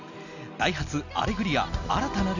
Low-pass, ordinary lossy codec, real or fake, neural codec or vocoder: 7.2 kHz; none; real; none